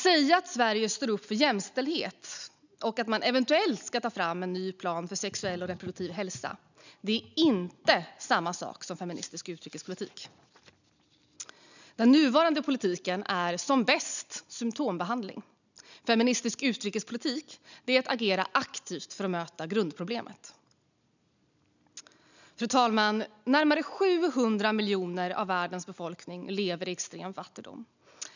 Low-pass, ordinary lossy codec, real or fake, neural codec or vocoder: 7.2 kHz; none; real; none